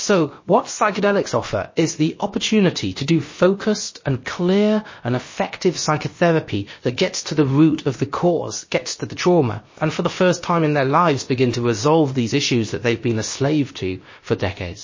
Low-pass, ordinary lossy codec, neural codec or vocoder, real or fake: 7.2 kHz; MP3, 32 kbps; codec, 16 kHz, about 1 kbps, DyCAST, with the encoder's durations; fake